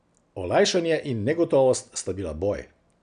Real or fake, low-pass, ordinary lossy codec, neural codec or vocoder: real; 9.9 kHz; none; none